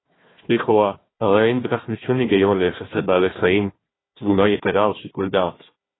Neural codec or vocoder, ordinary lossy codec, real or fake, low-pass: codec, 16 kHz, 1 kbps, FunCodec, trained on Chinese and English, 50 frames a second; AAC, 16 kbps; fake; 7.2 kHz